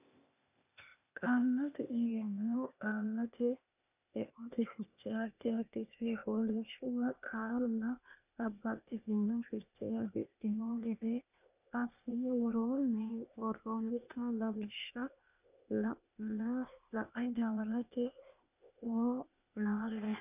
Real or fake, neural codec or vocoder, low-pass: fake; codec, 16 kHz, 0.8 kbps, ZipCodec; 3.6 kHz